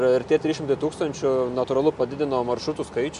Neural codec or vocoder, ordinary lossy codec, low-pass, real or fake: none; MP3, 64 kbps; 10.8 kHz; real